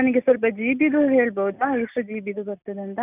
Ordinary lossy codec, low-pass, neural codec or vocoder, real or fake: none; 3.6 kHz; none; real